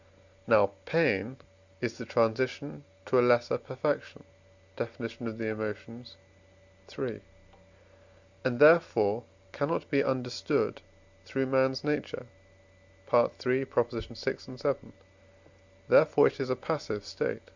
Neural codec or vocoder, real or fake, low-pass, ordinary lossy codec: none; real; 7.2 kHz; Opus, 64 kbps